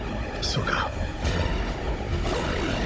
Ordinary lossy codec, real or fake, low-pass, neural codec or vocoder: none; fake; none; codec, 16 kHz, 16 kbps, FunCodec, trained on Chinese and English, 50 frames a second